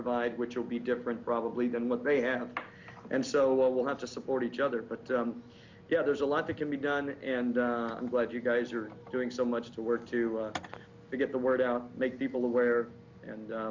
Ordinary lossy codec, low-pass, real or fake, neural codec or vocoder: MP3, 64 kbps; 7.2 kHz; real; none